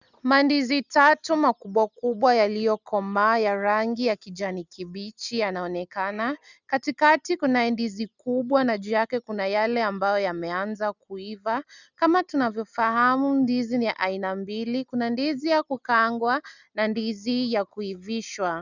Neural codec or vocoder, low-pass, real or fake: none; 7.2 kHz; real